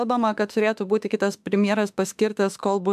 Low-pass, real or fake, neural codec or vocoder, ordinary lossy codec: 14.4 kHz; fake; autoencoder, 48 kHz, 32 numbers a frame, DAC-VAE, trained on Japanese speech; AAC, 96 kbps